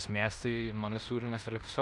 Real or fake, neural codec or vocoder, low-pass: fake; codec, 16 kHz in and 24 kHz out, 0.9 kbps, LongCat-Audio-Codec, fine tuned four codebook decoder; 10.8 kHz